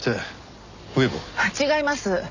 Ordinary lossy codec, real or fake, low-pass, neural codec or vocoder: Opus, 64 kbps; real; 7.2 kHz; none